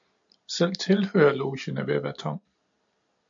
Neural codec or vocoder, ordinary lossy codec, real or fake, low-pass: none; MP3, 64 kbps; real; 7.2 kHz